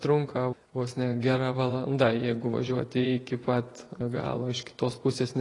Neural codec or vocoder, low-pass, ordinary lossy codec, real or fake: vocoder, 24 kHz, 100 mel bands, Vocos; 10.8 kHz; AAC, 32 kbps; fake